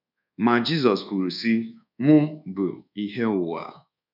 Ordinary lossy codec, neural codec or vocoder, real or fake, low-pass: none; codec, 24 kHz, 1.2 kbps, DualCodec; fake; 5.4 kHz